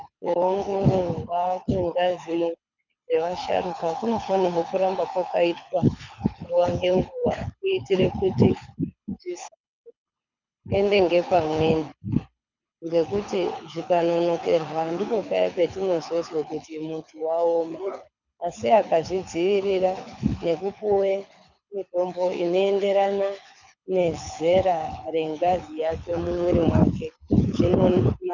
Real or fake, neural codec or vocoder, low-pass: fake; codec, 24 kHz, 6 kbps, HILCodec; 7.2 kHz